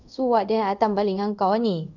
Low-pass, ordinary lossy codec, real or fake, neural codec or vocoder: 7.2 kHz; none; fake; codec, 24 kHz, 0.5 kbps, DualCodec